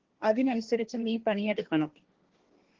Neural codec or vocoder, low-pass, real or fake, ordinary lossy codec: codec, 16 kHz, 1.1 kbps, Voila-Tokenizer; 7.2 kHz; fake; Opus, 16 kbps